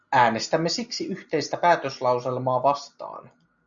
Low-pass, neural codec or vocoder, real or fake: 7.2 kHz; none; real